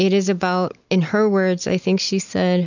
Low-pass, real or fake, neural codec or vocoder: 7.2 kHz; real; none